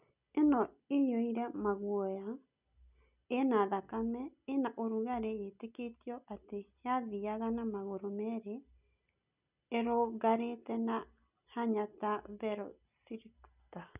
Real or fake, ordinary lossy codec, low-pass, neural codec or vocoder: real; none; 3.6 kHz; none